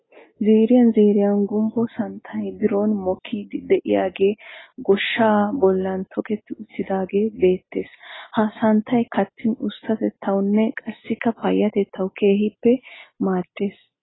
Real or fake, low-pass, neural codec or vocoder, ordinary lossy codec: real; 7.2 kHz; none; AAC, 16 kbps